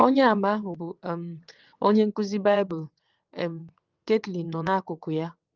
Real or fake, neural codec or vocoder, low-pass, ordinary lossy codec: fake; vocoder, 22.05 kHz, 80 mel bands, WaveNeXt; 7.2 kHz; Opus, 32 kbps